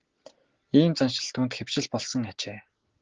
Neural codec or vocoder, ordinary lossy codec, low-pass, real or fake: none; Opus, 16 kbps; 7.2 kHz; real